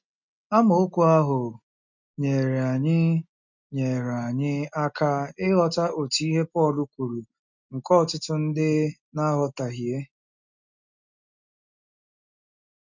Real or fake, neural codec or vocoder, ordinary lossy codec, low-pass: real; none; none; 7.2 kHz